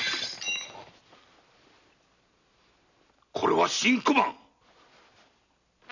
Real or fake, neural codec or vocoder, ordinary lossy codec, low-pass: real; none; none; 7.2 kHz